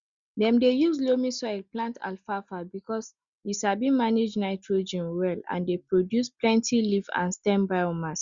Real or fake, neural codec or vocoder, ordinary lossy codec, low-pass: real; none; Opus, 64 kbps; 7.2 kHz